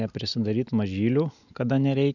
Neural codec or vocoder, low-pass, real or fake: none; 7.2 kHz; real